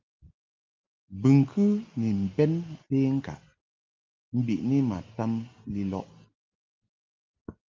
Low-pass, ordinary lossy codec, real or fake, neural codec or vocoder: 7.2 kHz; Opus, 32 kbps; real; none